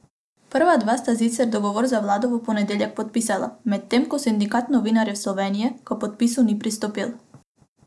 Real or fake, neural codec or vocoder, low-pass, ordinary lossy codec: real; none; none; none